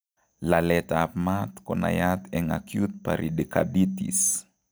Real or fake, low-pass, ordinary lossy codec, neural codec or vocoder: real; none; none; none